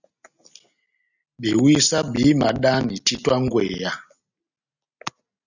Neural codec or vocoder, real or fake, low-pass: none; real; 7.2 kHz